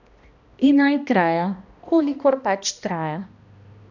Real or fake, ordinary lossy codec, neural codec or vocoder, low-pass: fake; none; codec, 16 kHz, 1 kbps, X-Codec, HuBERT features, trained on balanced general audio; 7.2 kHz